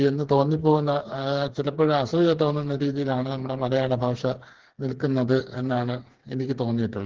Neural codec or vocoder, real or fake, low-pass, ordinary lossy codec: codec, 16 kHz, 4 kbps, FreqCodec, smaller model; fake; 7.2 kHz; Opus, 16 kbps